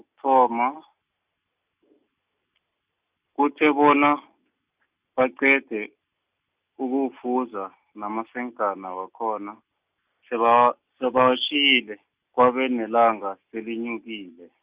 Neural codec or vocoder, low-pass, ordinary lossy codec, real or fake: none; 3.6 kHz; Opus, 64 kbps; real